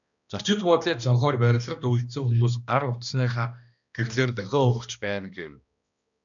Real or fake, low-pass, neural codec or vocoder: fake; 7.2 kHz; codec, 16 kHz, 1 kbps, X-Codec, HuBERT features, trained on balanced general audio